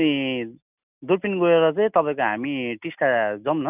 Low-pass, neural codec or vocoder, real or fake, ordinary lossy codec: 3.6 kHz; none; real; none